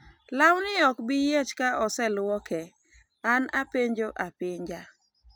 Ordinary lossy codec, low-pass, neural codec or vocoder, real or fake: none; none; none; real